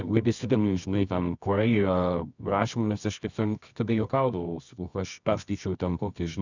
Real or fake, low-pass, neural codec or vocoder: fake; 7.2 kHz; codec, 24 kHz, 0.9 kbps, WavTokenizer, medium music audio release